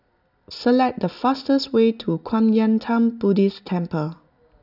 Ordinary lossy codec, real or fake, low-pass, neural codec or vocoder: none; real; 5.4 kHz; none